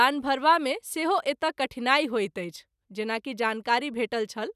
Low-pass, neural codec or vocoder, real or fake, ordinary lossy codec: 14.4 kHz; none; real; none